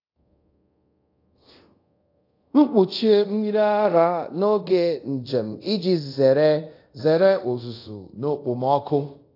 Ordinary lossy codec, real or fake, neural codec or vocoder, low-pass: AAC, 32 kbps; fake; codec, 24 kHz, 0.5 kbps, DualCodec; 5.4 kHz